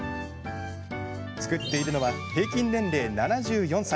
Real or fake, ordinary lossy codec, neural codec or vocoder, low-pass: real; none; none; none